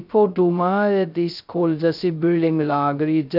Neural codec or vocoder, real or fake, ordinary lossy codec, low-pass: codec, 16 kHz, 0.2 kbps, FocalCodec; fake; none; 5.4 kHz